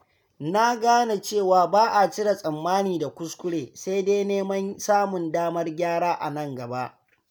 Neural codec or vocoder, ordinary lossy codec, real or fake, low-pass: none; none; real; none